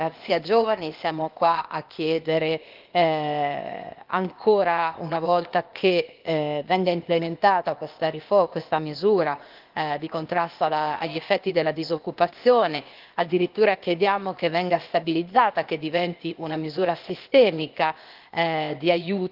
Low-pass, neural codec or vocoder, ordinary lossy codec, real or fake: 5.4 kHz; codec, 16 kHz, 0.8 kbps, ZipCodec; Opus, 32 kbps; fake